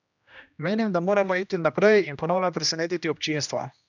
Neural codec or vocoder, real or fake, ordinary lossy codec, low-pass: codec, 16 kHz, 1 kbps, X-Codec, HuBERT features, trained on general audio; fake; none; 7.2 kHz